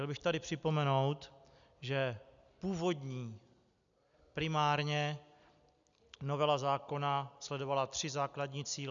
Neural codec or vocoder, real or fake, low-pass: none; real; 7.2 kHz